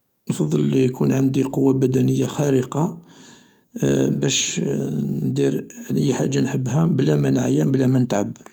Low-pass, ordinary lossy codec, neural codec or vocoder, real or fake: 19.8 kHz; none; codec, 44.1 kHz, 7.8 kbps, DAC; fake